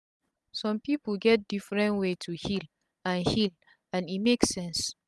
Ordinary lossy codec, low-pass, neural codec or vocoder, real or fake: none; none; none; real